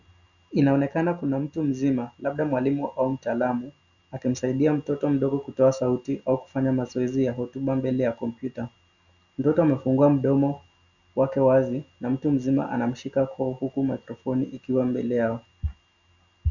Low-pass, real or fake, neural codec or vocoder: 7.2 kHz; real; none